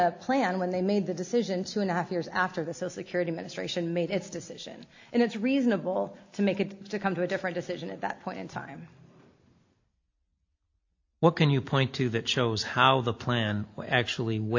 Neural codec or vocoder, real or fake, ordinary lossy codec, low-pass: none; real; AAC, 48 kbps; 7.2 kHz